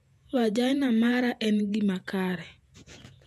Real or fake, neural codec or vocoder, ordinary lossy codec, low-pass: fake; vocoder, 48 kHz, 128 mel bands, Vocos; none; 14.4 kHz